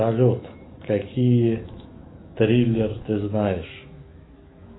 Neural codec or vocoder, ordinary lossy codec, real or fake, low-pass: autoencoder, 48 kHz, 128 numbers a frame, DAC-VAE, trained on Japanese speech; AAC, 16 kbps; fake; 7.2 kHz